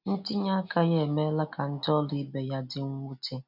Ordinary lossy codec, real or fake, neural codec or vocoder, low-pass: none; real; none; 5.4 kHz